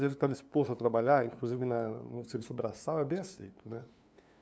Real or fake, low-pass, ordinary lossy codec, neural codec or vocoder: fake; none; none; codec, 16 kHz, 2 kbps, FunCodec, trained on LibriTTS, 25 frames a second